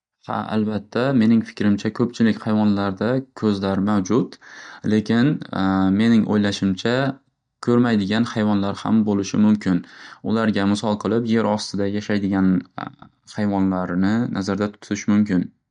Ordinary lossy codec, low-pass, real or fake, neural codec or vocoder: MP3, 64 kbps; 9.9 kHz; real; none